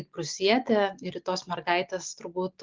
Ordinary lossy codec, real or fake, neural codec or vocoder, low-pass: Opus, 32 kbps; real; none; 7.2 kHz